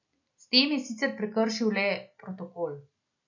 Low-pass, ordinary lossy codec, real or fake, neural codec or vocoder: 7.2 kHz; none; real; none